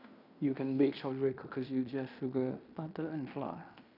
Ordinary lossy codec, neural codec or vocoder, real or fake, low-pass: none; codec, 16 kHz in and 24 kHz out, 0.9 kbps, LongCat-Audio-Codec, fine tuned four codebook decoder; fake; 5.4 kHz